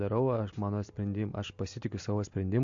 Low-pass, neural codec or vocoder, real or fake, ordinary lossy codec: 7.2 kHz; none; real; MP3, 48 kbps